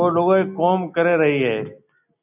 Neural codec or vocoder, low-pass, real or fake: none; 3.6 kHz; real